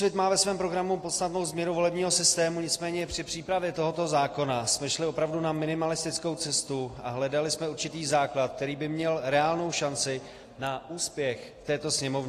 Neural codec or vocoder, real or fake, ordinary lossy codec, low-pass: none; real; AAC, 48 kbps; 14.4 kHz